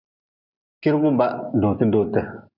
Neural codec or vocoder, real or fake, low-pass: none; real; 5.4 kHz